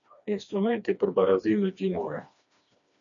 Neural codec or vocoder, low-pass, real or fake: codec, 16 kHz, 2 kbps, FreqCodec, smaller model; 7.2 kHz; fake